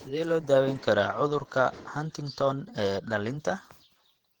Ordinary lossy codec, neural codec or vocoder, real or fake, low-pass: Opus, 16 kbps; vocoder, 48 kHz, 128 mel bands, Vocos; fake; 19.8 kHz